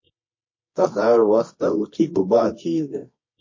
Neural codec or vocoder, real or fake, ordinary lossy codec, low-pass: codec, 24 kHz, 0.9 kbps, WavTokenizer, medium music audio release; fake; MP3, 32 kbps; 7.2 kHz